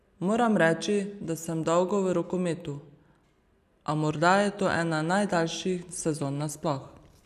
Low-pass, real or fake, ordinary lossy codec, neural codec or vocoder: 14.4 kHz; real; none; none